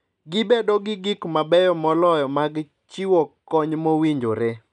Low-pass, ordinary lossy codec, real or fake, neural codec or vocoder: 10.8 kHz; none; real; none